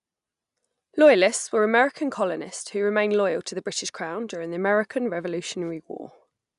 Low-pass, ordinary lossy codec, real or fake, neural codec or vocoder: 10.8 kHz; none; real; none